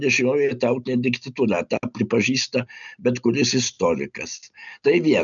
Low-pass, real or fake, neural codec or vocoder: 7.2 kHz; real; none